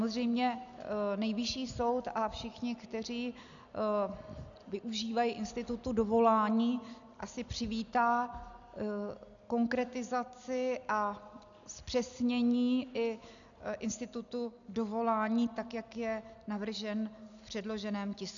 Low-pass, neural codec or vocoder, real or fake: 7.2 kHz; none; real